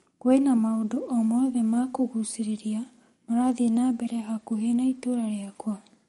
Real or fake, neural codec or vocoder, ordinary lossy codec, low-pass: fake; codec, 44.1 kHz, 7.8 kbps, DAC; MP3, 48 kbps; 19.8 kHz